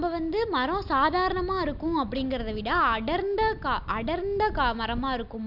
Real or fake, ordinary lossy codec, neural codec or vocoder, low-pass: real; none; none; 5.4 kHz